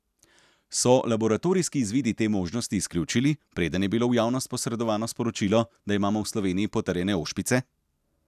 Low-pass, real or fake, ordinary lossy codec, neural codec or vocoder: 14.4 kHz; real; none; none